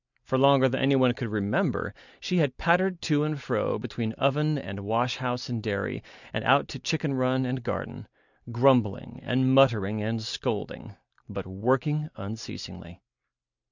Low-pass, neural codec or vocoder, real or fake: 7.2 kHz; none; real